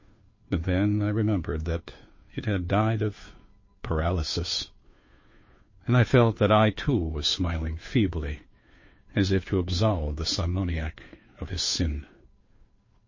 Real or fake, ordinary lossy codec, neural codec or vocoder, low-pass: fake; MP3, 32 kbps; codec, 16 kHz, 2 kbps, FunCodec, trained on Chinese and English, 25 frames a second; 7.2 kHz